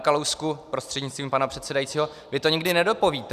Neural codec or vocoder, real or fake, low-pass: none; real; 14.4 kHz